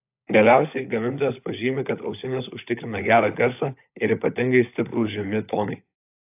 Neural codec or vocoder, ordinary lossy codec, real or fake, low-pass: codec, 16 kHz, 16 kbps, FunCodec, trained on LibriTTS, 50 frames a second; AAC, 32 kbps; fake; 3.6 kHz